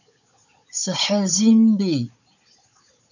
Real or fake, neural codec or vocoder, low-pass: fake; codec, 16 kHz, 16 kbps, FunCodec, trained on Chinese and English, 50 frames a second; 7.2 kHz